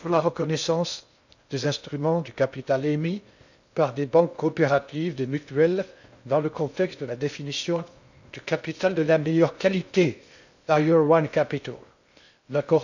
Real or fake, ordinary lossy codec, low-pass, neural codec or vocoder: fake; none; 7.2 kHz; codec, 16 kHz in and 24 kHz out, 0.6 kbps, FocalCodec, streaming, 2048 codes